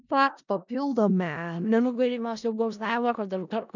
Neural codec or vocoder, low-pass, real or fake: codec, 16 kHz in and 24 kHz out, 0.4 kbps, LongCat-Audio-Codec, four codebook decoder; 7.2 kHz; fake